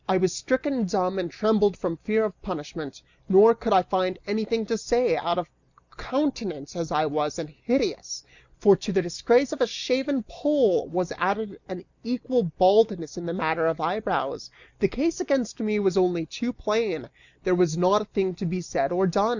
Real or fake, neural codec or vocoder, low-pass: real; none; 7.2 kHz